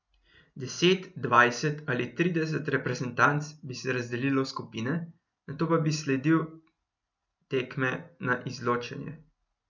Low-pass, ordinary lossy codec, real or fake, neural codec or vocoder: 7.2 kHz; none; real; none